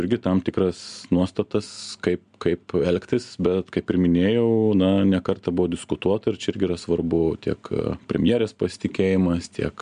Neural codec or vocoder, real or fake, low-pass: none; real; 9.9 kHz